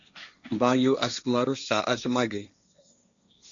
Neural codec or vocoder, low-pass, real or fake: codec, 16 kHz, 1.1 kbps, Voila-Tokenizer; 7.2 kHz; fake